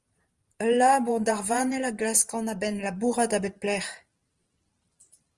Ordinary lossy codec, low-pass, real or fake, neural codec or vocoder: Opus, 24 kbps; 10.8 kHz; fake; vocoder, 44.1 kHz, 128 mel bands every 512 samples, BigVGAN v2